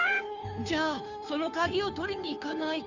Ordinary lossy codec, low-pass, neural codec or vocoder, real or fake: none; 7.2 kHz; codec, 16 kHz, 2 kbps, FunCodec, trained on Chinese and English, 25 frames a second; fake